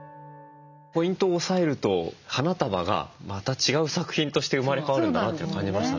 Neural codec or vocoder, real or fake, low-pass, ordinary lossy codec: none; real; 7.2 kHz; none